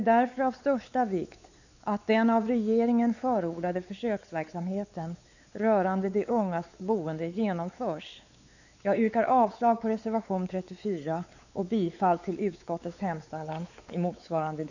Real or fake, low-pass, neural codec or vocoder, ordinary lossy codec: fake; 7.2 kHz; codec, 16 kHz, 4 kbps, X-Codec, WavLM features, trained on Multilingual LibriSpeech; none